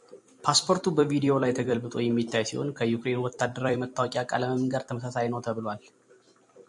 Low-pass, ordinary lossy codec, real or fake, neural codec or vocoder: 10.8 kHz; MP3, 64 kbps; fake; vocoder, 44.1 kHz, 128 mel bands every 256 samples, BigVGAN v2